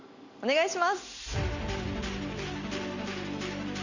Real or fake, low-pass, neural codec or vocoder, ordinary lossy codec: real; 7.2 kHz; none; none